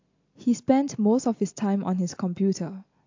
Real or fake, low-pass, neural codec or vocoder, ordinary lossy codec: real; 7.2 kHz; none; AAC, 48 kbps